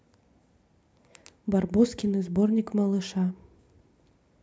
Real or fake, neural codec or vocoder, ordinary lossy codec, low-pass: real; none; none; none